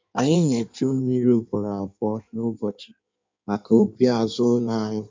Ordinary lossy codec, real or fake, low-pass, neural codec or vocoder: MP3, 64 kbps; fake; 7.2 kHz; codec, 16 kHz in and 24 kHz out, 1.1 kbps, FireRedTTS-2 codec